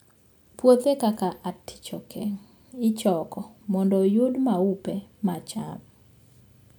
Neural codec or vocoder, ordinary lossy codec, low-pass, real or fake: none; none; none; real